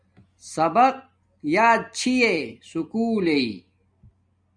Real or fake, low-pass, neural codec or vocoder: real; 9.9 kHz; none